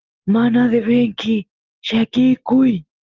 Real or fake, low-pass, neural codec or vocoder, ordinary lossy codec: real; 7.2 kHz; none; Opus, 16 kbps